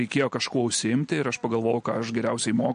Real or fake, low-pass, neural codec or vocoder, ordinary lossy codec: real; 9.9 kHz; none; MP3, 48 kbps